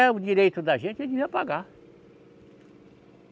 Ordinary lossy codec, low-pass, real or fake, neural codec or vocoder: none; none; real; none